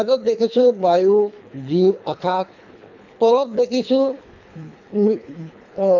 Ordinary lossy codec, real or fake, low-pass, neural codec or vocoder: none; fake; 7.2 kHz; codec, 24 kHz, 3 kbps, HILCodec